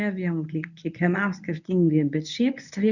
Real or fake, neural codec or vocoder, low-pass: fake; codec, 24 kHz, 0.9 kbps, WavTokenizer, medium speech release version 1; 7.2 kHz